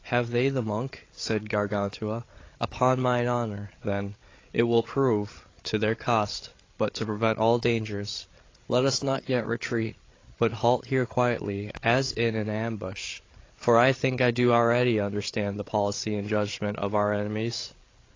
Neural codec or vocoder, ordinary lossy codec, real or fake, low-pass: codec, 16 kHz, 8 kbps, FreqCodec, larger model; AAC, 32 kbps; fake; 7.2 kHz